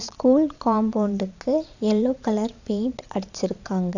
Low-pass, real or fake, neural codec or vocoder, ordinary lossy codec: 7.2 kHz; fake; vocoder, 22.05 kHz, 80 mel bands, Vocos; none